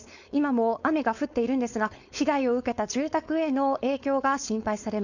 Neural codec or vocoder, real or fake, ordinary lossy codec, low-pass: codec, 16 kHz, 4.8 kbps, FACodec; fake; none; 7.2 kHz